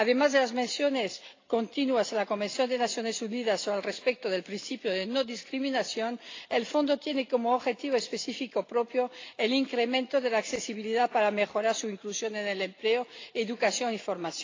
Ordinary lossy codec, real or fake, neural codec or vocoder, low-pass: AAC, 32 kbps; real; none; 7.2 kHz